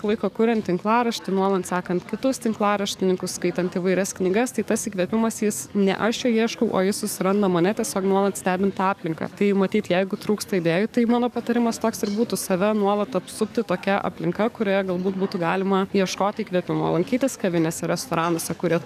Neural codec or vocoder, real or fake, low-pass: codec, 44.1 kHz, 7.8 kbps, DAC; fake; 14.4 kHz